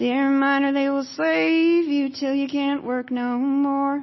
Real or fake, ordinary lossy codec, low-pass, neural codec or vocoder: real; MP3, 24 kbps; 7.2 kHz; none